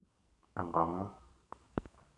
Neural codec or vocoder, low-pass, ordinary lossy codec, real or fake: codec, 44.1 kHz, 2.6 kbps, SNAC; 10.8 kHz; none; fake